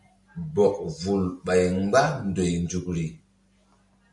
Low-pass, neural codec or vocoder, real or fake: 10.8 kHz; none; real